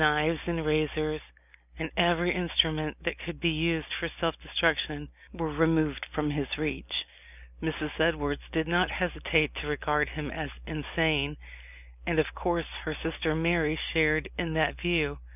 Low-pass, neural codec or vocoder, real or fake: 3.6 kHz; none; real